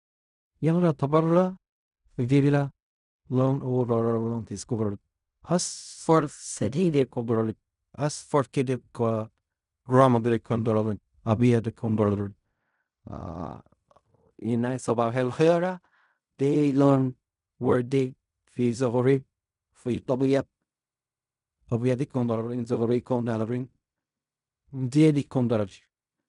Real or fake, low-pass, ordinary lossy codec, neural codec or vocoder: fake; 10.8 kHz; none; codec, 16 kHz in and 24 kHz out, 0.4 kbps, LongCat-Audio-Codec, fine tuned four codebook decoder